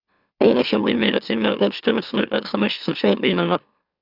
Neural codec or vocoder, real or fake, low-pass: autoencoder, 44.1 kHz, a latent of 192 numbers a frame, MeloTTS; fake; 5.4 kHz